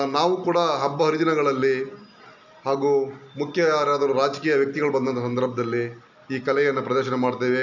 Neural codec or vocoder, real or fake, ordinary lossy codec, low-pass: none; real; none; 7.2 kHz